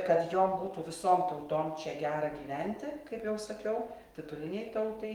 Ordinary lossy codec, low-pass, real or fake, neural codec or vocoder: Opus, 24 kbps; 19.8 kHz; fake; codec, 44.1 kHz, 7.8 kbps, DAC